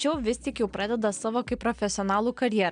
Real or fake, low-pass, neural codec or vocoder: real; 9.9 kHz; none